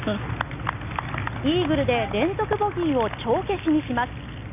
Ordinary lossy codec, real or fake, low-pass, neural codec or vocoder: none; real; 3.6 kHz; none